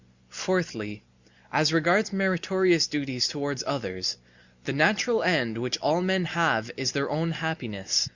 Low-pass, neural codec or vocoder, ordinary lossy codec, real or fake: 7.2 kHz; none; Opus, 64 kbps; real